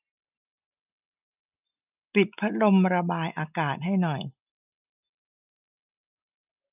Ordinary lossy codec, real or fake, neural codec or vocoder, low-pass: none; real; none; 3.6 kHz